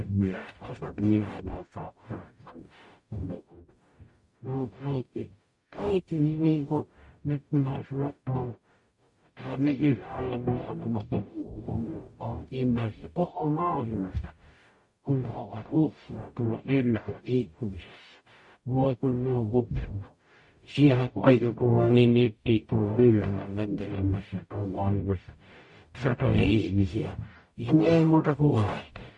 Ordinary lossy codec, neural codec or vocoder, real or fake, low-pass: MP3, 48 kbps; codec, 44.1 kHz, 0.9 kbps, DAC; fake; 10.8 kHz